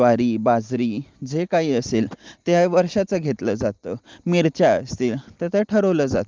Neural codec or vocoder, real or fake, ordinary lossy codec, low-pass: none; real; Opus, 32 kbps; 7.2 kHz